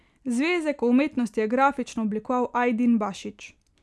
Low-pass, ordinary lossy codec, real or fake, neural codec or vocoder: none; none; real; none